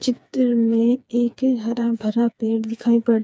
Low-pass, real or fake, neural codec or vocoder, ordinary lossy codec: none; fake; codec, 16 kHz, 4 kbps, FreqCodec, smaller model; none